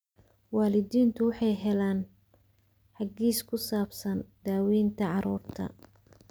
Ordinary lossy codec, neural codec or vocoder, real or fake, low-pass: none; none; real; none